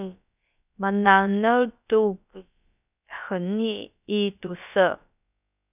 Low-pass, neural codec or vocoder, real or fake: 3.6 kHz; codec, 16 kHz, about 1 kbps, DyCAST, with the encoder's durations; fake